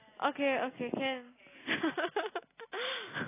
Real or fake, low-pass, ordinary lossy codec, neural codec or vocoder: real; 3.6 kHz; AAC, 16 kbps; none